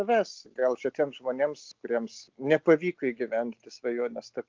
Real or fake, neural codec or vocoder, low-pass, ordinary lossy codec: real; none; 7.2 kHz; Opus, 24 kbps